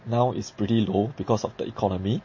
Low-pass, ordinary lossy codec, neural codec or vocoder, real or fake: 7.2 kHz; MP3, 32 kbps; none; real